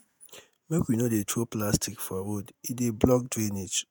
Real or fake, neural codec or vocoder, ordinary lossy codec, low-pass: fake; vocoder, 48 kHz, 128 mel bands, Vocos; none; none